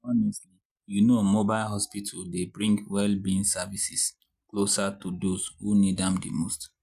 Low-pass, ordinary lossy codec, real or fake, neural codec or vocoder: 14.4 kHz; none; real; none